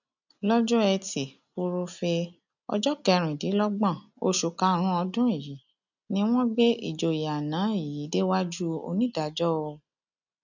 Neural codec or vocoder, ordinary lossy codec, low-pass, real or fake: none; none; 7.2 kHz; real